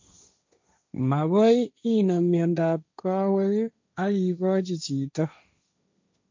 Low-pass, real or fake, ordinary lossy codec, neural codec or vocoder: 7.2 kHz; fake; MP3, 64 kbps; codec, 16 kHz, 1.1 kbps, Voila-Tokenizer